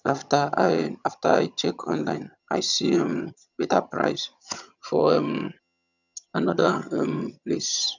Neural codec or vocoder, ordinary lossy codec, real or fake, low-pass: vocoder, 22.05 kHz, 80 mel bands, HiFi-GAN; none; fake; 7.2 kHz